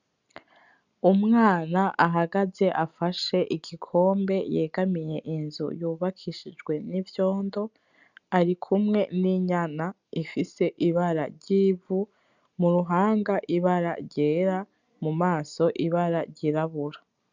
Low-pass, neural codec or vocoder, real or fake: 7.2 kHz; none; real